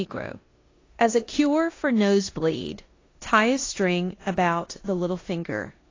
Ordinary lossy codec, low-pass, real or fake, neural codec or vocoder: AAC, 32 kbps; 7.2 kHz; fake; codec, 16 kHz in and 24 kHz out, 0.9 kbps, LongCat-Audio-Codec, four codebook decoder